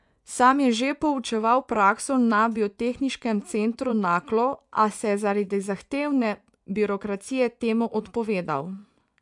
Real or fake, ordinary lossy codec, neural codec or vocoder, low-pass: fake; none; vocoder, 24 kHz, 100 mel bands, Vocos; 10.8 kHz